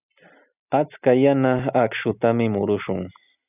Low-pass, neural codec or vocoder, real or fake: 3.6 kHz; none; real